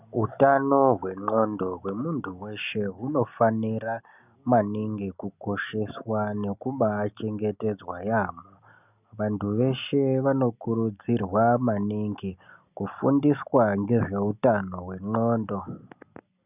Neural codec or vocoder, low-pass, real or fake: none; 3.6 kHz; real